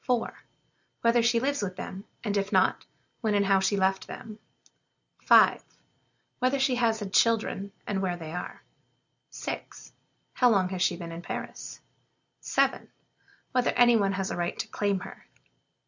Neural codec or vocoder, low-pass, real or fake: none; 7.2 kHz; real